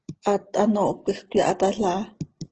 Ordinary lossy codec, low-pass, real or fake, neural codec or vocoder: Opus, 16 kbps; 7.2 kHz; real; none